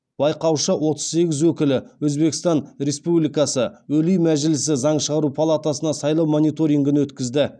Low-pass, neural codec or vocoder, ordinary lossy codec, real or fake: 9.9 kHz; none; none; real